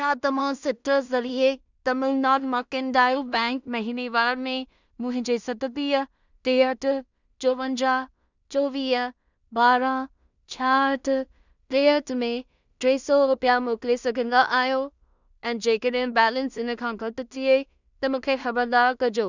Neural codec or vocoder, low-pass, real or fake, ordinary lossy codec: codec, 16 kHz in and 24 kHz out, 0.4 kbps, LongCat-Audio-Codec, two codebook decoder; 7.2 kHz; fake; none